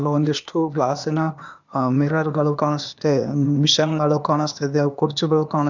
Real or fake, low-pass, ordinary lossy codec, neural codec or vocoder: fake; 7.2 kHz; none; codec, 16 kHz, 0.8 kbps, ZipCodec